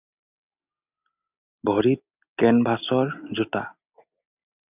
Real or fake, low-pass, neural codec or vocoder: real; 3.6 kHz; none